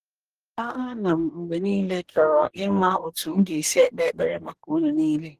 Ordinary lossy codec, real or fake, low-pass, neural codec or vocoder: Opus, 16 kbps; fake; 14.4 kHz; codec, 44.1 kHz, 2.6 kbps, DAC